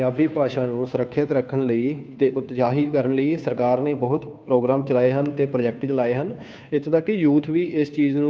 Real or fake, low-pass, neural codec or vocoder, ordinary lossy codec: fake; none; codec, 16 kHz, 2 kbps, FunCodec, trained on Chinese and English, 25 frames a second; none